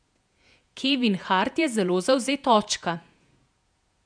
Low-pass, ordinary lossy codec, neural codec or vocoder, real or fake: 9.9 kHz; none; vocoder, 48 kHz, 128 mel bands, Vocos; fake